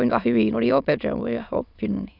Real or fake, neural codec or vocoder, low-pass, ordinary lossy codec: fake; autoencoder, 22.05 kHz, a latent of 192 numbers a frame, VITS, trained on many speakers; 5.4 kHz; none